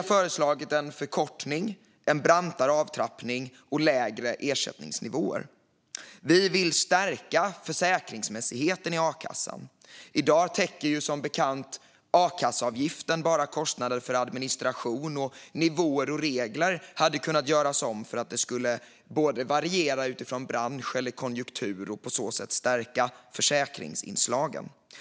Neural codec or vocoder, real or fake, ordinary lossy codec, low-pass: none; real; none; none